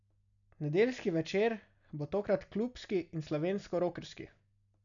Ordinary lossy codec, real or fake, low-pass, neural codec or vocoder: none; real; 7.2 kHz; none